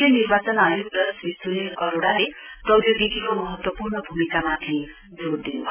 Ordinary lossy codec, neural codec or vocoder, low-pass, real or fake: none; none; 3.6 kHz; real